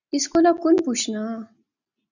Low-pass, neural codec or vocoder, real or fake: 7.2 kHz; none; real